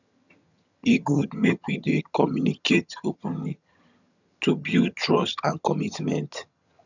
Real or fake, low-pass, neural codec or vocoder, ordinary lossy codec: fake; 7.2 kHz; vocoder, 22.05 kHz, 80 mel bands, HiFi-GAN; none